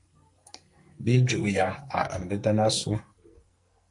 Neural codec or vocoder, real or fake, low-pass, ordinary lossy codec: codec, 44.1 kHz, 2.6 kbps, SNAC; fake; 10.8 kHz; MP3, 64 kbps